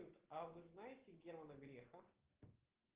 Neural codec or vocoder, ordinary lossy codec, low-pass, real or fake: none; Opus, 16 kbps; 3.6 kHz; real